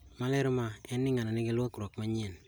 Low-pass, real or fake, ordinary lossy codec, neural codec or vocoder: none; real; none; none